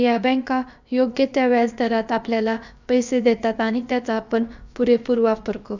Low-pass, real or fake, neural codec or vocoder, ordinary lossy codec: 7.2 kHz; fake; codec, 16 kHz, about 1 kbps, DyCAST, with the encoder's durations; none